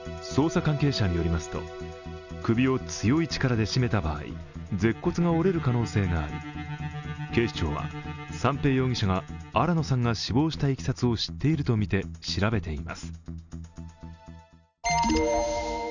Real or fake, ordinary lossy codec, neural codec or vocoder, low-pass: real; none; none; 7.2 kHz